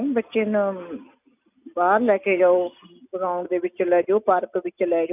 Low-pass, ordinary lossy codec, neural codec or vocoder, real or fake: 3.6 kHz; none; none; real